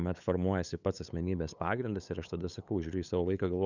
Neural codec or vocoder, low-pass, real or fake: codec, 16 kHz, 8 kbps, FunCodec, trained on LibriTTS, 25 frames a second; 7.2 kHz; fake